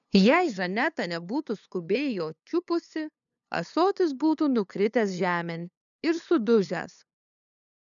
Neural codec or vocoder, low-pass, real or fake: codec, 16 kHz, 2 kbps, FunCodec, trained on LibriTTS, 25 frames a second; 7.2 kHz; fake